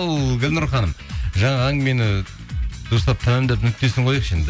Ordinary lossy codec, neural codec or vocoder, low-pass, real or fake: none; none; none; real